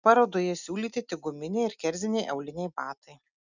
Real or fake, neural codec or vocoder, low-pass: real; none; 7.2 kHz